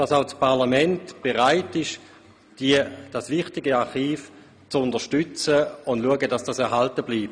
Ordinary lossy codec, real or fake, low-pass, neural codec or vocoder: none; real; 9.9 kHz; none